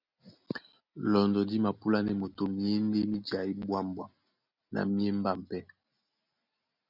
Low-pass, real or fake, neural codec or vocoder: 5.4 kHz; real; none